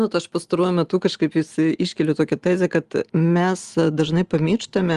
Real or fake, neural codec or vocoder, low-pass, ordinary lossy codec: real; none; 10.8 kHz; Opus, 24 kbps